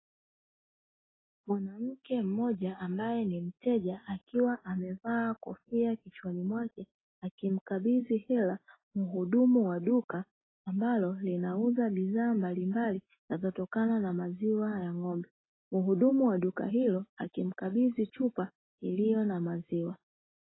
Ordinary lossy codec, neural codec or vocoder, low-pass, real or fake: AAC, 16 kbps; none; 7.2 kHz; real